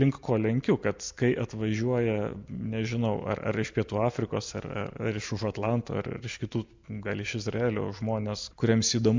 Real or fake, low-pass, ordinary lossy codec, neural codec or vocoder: real; 7.2 kHz; MP3, 64 kbps; none